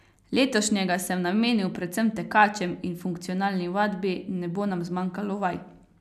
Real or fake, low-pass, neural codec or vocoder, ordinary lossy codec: real; 14.4 kHz; none; none